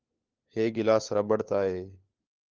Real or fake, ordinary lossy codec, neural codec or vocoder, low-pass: fake; Opus, 32 kbps; codec, 16 kHz, 4 kbps, FunCodec, trained on LibriTTS, 50 frames a second; 7.2 kHz